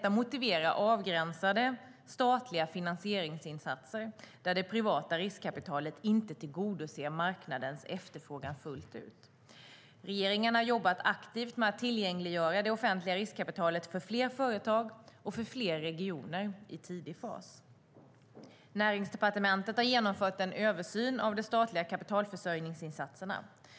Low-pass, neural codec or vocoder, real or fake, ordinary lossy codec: none; none; real; none